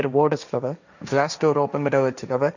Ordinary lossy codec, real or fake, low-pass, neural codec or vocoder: none; fake; 7.2 kHz; codec, 16 kHz, 1.1 kbps, Voila-Tokenizer